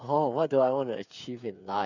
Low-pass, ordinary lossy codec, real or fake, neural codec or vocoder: 7.2 kHz; none; fake; codec, 16 kHz, 8 kbps, FreqCodec, smaller model